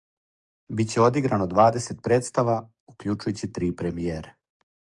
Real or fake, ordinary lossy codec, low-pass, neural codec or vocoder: fake; Opus, 64 kbps; 10.8 kHz; codec, 44.1 kHz, 7.8 kbps, DAC